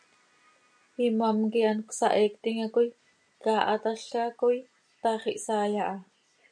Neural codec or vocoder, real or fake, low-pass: none; real; 9.9 kHz